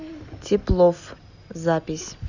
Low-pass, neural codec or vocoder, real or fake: 7.2 kHz; none; real